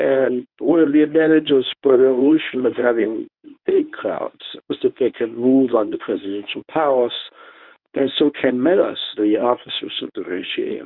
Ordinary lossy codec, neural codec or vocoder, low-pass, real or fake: Opus, 64 kbps; codec, 24 kHz, 0.9 kbps, WavTokenizer, medium speech release version 1; 5.4 kHz; fake